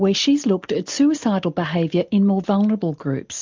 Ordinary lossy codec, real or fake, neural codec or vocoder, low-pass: MP3, 64 kbps; real; none; 7.2 kHz